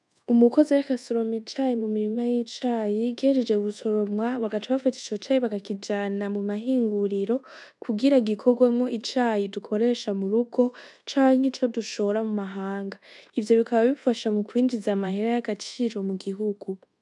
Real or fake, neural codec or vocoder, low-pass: fake; codec, 24 kHz, 1.2 kbps, DualCodec; 10.8 kHz